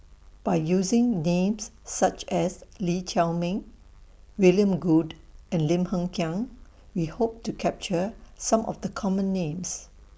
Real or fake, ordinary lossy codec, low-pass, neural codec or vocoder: real; none; none; none